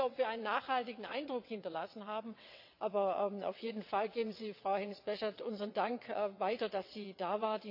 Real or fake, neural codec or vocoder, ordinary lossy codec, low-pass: fake; vocoder, 22.05 kHz, 80 mel bands, WaveNeXt; MP3, 48 kbps; 5.4 kHz